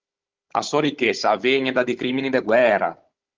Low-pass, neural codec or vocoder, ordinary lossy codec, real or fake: 7.2 kHz; codec, 16 kHz, 16 kbps, FunCodec, trained on Chinese and English, 50 frames a second; Opus, 32 kbps; fake